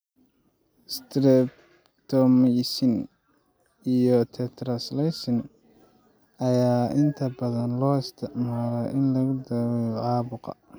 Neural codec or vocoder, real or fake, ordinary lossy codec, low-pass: none; real; none; none